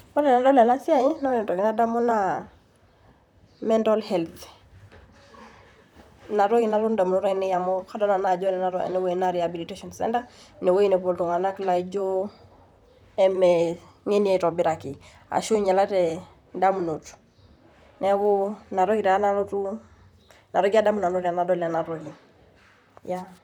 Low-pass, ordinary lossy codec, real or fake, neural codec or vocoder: 19.8 kHz; none; fake; vocoder, 44.1 kHz, 128 mel bands, Pupu-Vocoder